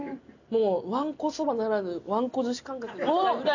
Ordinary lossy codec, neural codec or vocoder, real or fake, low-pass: none; none; real; 7.2 kHz